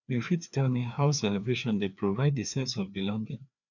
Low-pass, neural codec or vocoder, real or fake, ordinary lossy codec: 7.2 kHz; codec, 16 kHz, 2 kbps, FreqCodec, larger model; fake; none